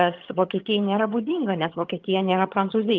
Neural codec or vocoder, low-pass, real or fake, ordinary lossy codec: vocoder, 22.05 kHz, 80 mel bands, HiFi-GAN; 7.2 kHz; fake; Opus, 32 kbps